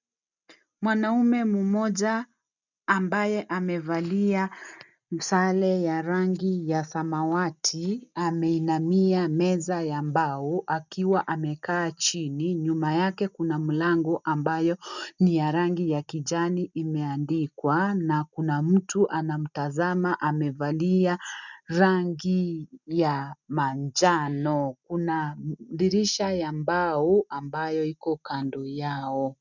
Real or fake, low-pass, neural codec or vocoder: real; 7.2 kHz; none